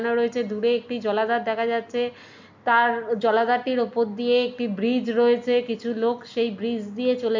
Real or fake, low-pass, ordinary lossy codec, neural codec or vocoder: real; 7.2 kHz; MP3, 64 kbps; none